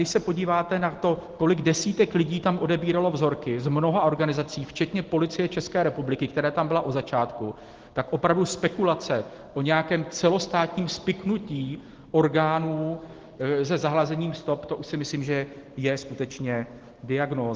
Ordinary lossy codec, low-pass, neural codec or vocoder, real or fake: Opus, 16 kbps; 7.2 kHz; none; real